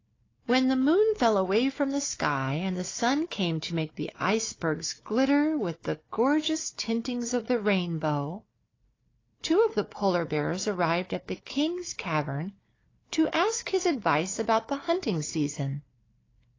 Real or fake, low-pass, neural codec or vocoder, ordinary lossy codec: fake; 7.2 kHz; codec, 16 kHz, 4 kbps, FunCodec, trained on Chinese and English, 50 frames a second; AAC, 32 kbps